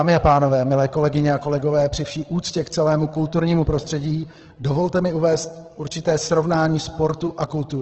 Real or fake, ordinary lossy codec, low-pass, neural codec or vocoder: fake; Opus, 16 kbps; 7.2 kHz; codec, 16 kHz, 8 kbps, FreqCodec, larger model